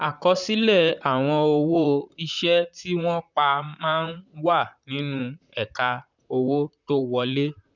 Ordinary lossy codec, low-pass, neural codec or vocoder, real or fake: none; 7.2 kHz; vocoder, 44.1 kHz, 128 mel bands, Pupu-Vocoder; fake